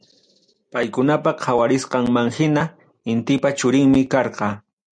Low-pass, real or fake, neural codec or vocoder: 9.9 kHz; real; none